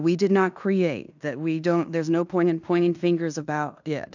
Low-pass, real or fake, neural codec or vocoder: 7.2 kHz; fake; codec, 16 kHz in and 24 kHz out, 0.9 kbps, LongCat-Audio-Codec, fine tuned four codebook decoder